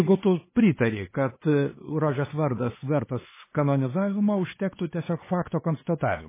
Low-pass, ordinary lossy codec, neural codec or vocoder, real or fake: 3.6 kHz; MP3, 16 kbps; codec, 16 kHz, 4 kbps, X-Codec, HuBERT features, trained on LibriSpeech; fake